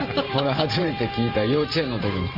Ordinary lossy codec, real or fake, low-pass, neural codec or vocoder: Opus, 32 kbps; fake; 5.4 kHz; vocoder, 44.1 kHz, 128 mel bands every 512 samples, BigVGAN v2